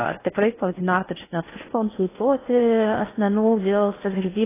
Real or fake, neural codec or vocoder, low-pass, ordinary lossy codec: fake; codec, 16 kHz in and 24 kHz out, 0.6 kbps, FocalCodec, streaming, 4096 codes; 3.6 kHz; AAC, 16 kbps